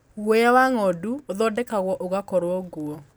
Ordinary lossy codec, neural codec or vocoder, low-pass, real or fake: none; none; none; real